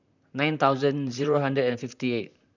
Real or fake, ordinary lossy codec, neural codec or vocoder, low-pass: fake; none; vocoder, 44.1 kHz, 128 mel bands, Pupu-Vocoder; 7.2 kHz